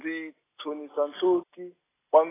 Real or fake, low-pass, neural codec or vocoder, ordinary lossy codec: real; 3.6 kHz; none; AAC, 16 kbps